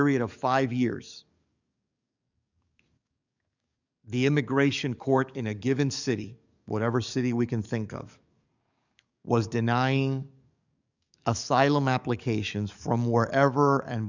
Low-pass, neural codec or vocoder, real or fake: 7.2 kHz; codec, 44.1 kHz, 7.8 kbps, DAC; fake